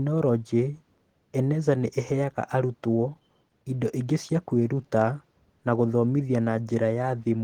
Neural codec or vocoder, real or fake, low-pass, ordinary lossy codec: none; real; 19.8 kHz; Opus, 16 kbps